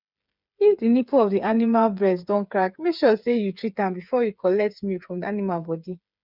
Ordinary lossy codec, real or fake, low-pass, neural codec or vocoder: none; fake; 5.4 kHz; codec, 16 kHz, 8 kbps, FreqCodec, smaller model